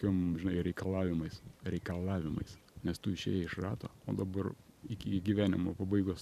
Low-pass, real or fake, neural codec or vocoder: 14.4 kHz; fake; vocoder, 44.1 kHz, 128 mel bands every 512 samples, BigVGAN v2